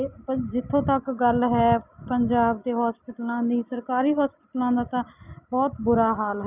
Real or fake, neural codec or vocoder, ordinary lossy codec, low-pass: real; none; none; 3.6 kHz